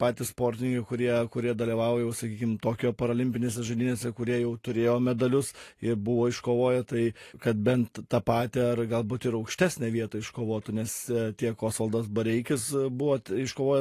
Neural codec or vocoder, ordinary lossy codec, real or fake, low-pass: none; AAC, 48 kbps; real; 14.4 kHz